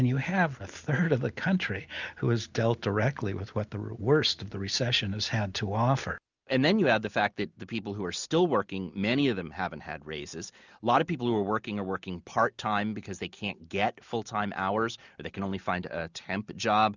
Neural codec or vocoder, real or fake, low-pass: none; real; 7.2 kHz